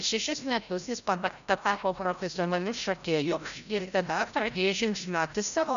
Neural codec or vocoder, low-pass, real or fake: codec, 16 kHz, 0.5 kbps, FreqCodec, larger model; 7.2 kHz; fake